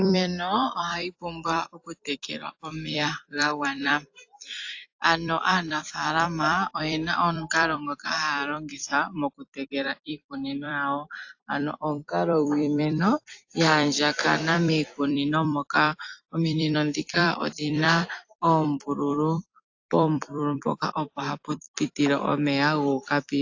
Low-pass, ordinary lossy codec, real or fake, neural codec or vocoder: 7.2 kHz; AAC, 48 kbps; fake; vocoder, 44.1 kHz, 128 mel bands every 256 samples, BigVGAN v2